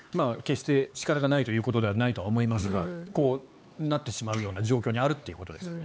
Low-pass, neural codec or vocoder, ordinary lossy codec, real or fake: none; codec, 16 kHz, 4 kbps, X-Codec, HuBERT features, trained on LibriSpeech; none; fake